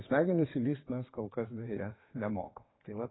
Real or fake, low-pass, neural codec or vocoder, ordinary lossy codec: fake; 7.2 kHz; vocoder, 22.05 kHz, 80 mel bands, WaveNeXt; AAC, 16 kbps